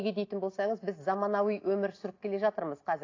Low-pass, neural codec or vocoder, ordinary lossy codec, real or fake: 7.2 kHz; none; AAC, 32 kbps; real